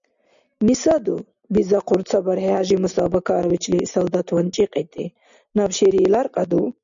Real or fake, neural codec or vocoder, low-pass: real; none; 7.2 kHz